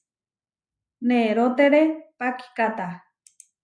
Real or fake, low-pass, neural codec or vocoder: real; 9.9 kHz; none